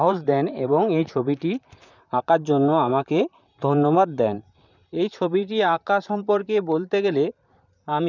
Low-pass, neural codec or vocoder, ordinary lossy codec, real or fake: 7.2 kHz; vocoder, 44.1 kHz, 128 mel bands, Pupu-Vocoder; none; fake